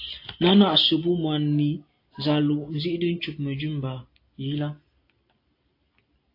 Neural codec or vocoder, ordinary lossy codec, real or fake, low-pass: none; AAC, 32 kbps; real; 5.4 kHz